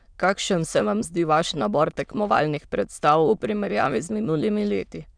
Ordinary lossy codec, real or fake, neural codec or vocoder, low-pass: none; fake; autoencoder, 22.05 kHz, a latent of 192 numbers a frame, VITS, trained on many speakers; 9.9 kHz